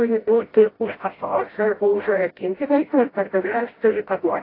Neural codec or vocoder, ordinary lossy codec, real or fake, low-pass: codec, 16 kHz, 0.5 kbps, FreqCodec, smaller model; AAC, 24 kbps; fake; 5.4 kHz